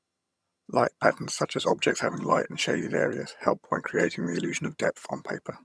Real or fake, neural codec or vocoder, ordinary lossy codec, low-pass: fake; vocoder, 22.05 kHz, 80 mel bands, HiFi-GAN; none; none